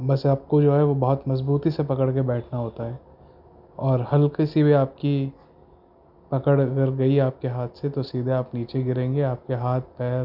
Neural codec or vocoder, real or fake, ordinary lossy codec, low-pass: none; real; none; 5.4 kHz